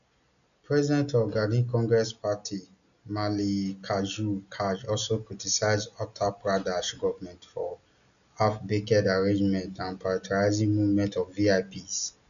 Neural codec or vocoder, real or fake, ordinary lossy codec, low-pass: none; real; none; 7.2 kHz